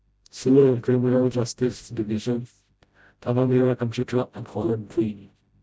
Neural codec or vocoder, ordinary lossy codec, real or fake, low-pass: codec, 16 kHz, 0.5 kbps, FreqCodec, smaller model; none; fake; none